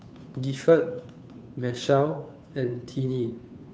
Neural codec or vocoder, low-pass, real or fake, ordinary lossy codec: codec, 16 kHz, 2 kbps, FunCodec, trained on Chinese and English, 25 frames a second; none; fake; none